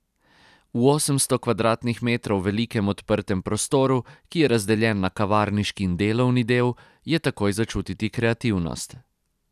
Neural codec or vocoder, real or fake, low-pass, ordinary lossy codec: none; real; 14.4 kHz; none